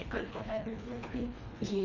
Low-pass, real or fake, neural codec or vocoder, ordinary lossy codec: 7.2 kHz; fake; codec, 24 kHz, 1.5 kbps, HILCodec; none